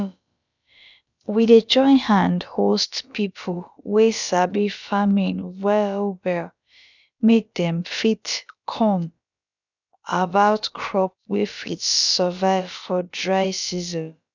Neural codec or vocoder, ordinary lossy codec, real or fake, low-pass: codec, 16 kHz, about 1 kbps, DyCAST, with the encoder's durations; none; fake; 7.2 kHz